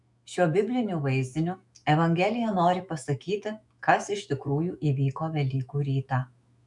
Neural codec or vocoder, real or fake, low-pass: autoencoder, 48 kHz, 128 numbers a frame, DAC-VAE, trained on Japanese speech; fake; 10.8 kHz